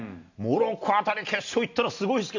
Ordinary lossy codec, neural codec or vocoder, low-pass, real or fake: none; none; 7.2 kHz; real